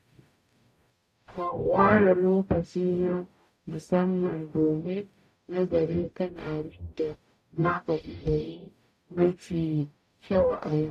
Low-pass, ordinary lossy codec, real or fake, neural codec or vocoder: 14.4 kHz; none; fake; codec, 44.1 kHz, 0.9 kbps, DAC